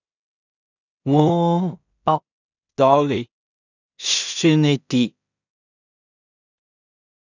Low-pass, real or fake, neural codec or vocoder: 7.2 kHz; fake; codec, 16 kHz in and 24 kHz out, 0.4 kbps, LongCat-Audio-Codec, two codebook decoder